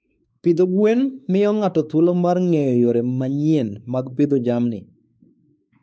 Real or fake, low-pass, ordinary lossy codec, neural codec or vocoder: fake; none; none; codec, 16 kHz, 4 kbps, X-Codec, WavLM features, trained on Multilingual LibriSpeech